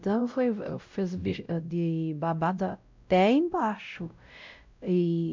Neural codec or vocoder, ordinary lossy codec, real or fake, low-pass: codec, 16 kHz, 0.5 kbps, X-Codec, WavLM features, trained on Multilingual LibriSpeech; MP3, 64 kbps; fake; 7.2 kHz